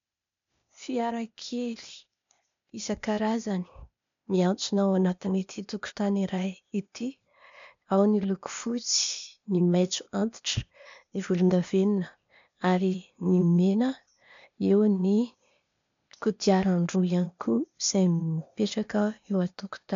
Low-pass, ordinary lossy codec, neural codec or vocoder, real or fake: 7.2 kHz; MP3, 64 kbps; codec, 16 kHz, 0.8 kbps, ZipCodec; fake